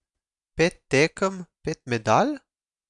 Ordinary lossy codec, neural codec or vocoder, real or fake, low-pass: none; none; real; 10.8 kHz